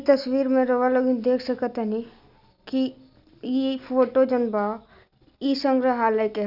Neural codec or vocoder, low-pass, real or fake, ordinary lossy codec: none; 5.4 kHz; real; none